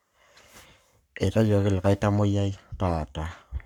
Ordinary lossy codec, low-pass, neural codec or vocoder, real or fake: none; 19.8 kHz; codec, 44.1 kHz, 7.8 kbps, Pupu-Codec; fake